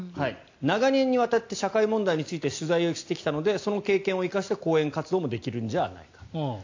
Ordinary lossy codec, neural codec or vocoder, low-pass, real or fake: AAC, 48 kbps; none; 7.2 kHz; real